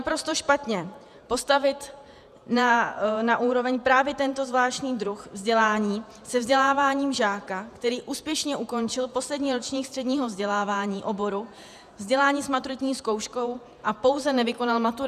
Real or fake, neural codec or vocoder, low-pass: fake; vocoder, 48 kHz, 128 mel bands, Vocos; 14.4 kHz